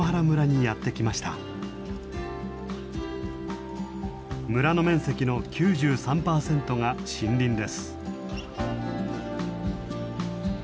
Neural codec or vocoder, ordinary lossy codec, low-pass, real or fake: none; none; none; real